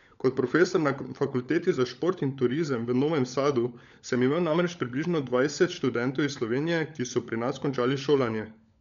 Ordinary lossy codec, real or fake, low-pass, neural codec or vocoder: none; fake; 7.2 kHz; codec, 16 kHz, 16 kbps, FunCodec, trained on LibriTTS, 50 frames a second